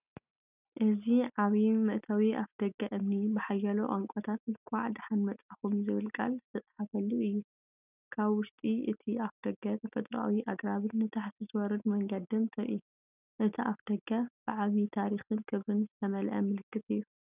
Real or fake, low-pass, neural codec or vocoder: real; 3.6 kHz; none